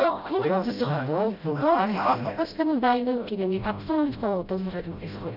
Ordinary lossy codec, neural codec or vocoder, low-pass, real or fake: none; codec, 16 kHz, 0.5 kbps, FreqCodec, smaller model; 5.4 kHz; fake